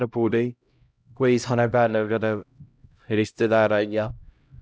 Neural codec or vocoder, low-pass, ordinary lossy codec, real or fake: codec, 16 kHz, 0.5 kbps, X-Codec, HuBERT features, trained on LibriSpeech; none; none; fake